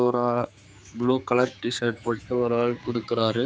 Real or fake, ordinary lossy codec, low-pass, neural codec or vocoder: fake; none; none; codec, 16 kHz, 4 kbps, X-Codec, HuBERT features, trained on general audio